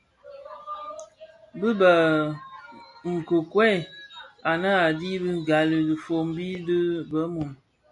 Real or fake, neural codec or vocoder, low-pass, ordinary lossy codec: real; none; 10.8 kHz; MP3, 96 kbps